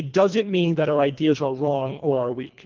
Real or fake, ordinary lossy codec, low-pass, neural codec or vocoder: fake; Opus, 32 kbps; 7.2 kHz; codec, 24 kHz, 1.5 kbps, HILCodec